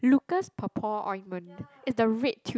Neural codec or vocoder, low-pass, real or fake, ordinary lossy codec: none; none; real; none